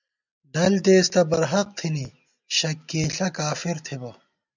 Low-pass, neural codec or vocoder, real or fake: 7.2 kHz; none; real